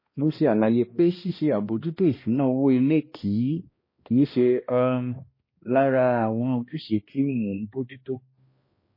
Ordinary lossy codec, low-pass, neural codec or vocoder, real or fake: MP3, 24 kbps; 5.4 kHz; codec, 16 kHz, 1 kbps, X-Codec, HuBERT features, trained on balanced general audio; fake